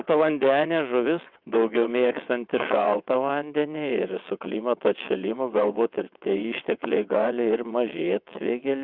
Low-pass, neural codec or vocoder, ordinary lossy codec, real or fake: 5.4 kHz; vocoder, 22.05 kHz, 80 mel bands, WaveNeXt; Opus, 64 kbps; fake